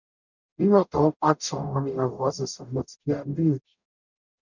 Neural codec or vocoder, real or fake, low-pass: codec, 44.1 kHz, 0.9 kbps, DAC; fake; 7.2 kHz